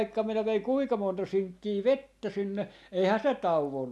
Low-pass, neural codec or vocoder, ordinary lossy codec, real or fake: none; none; none; real